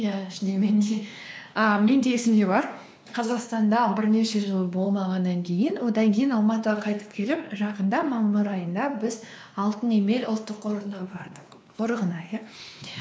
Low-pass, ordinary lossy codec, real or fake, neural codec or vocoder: none; none; fake; codec, 16 kHz, 2 kbps, X-Codec, WavLM features, trained on Multilingual LibriSpeech